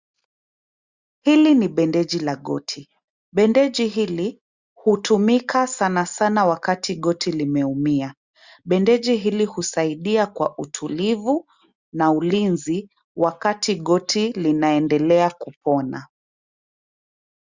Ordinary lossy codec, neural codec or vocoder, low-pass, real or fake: Opus, 64 kbps; none; 7.2 kHz; real